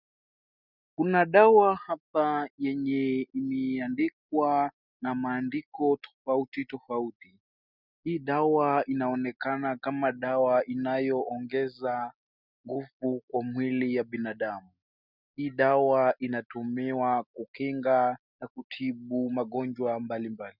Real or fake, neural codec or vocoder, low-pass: real; none; 5.4 kHz